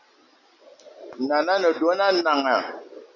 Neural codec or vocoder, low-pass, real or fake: none; 7.2 kHz; real